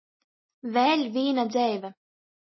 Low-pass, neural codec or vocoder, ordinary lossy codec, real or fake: 7.2 kHz; none; MP3, 24 kbps; real